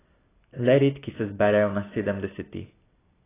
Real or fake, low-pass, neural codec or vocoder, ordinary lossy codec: real; 3.6 kHz; none; AAC, 16 kbps